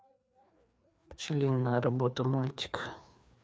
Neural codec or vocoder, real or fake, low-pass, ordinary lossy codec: codec, 16 kHz, 2 kbps, FreqCodec, larger model; fake; none; none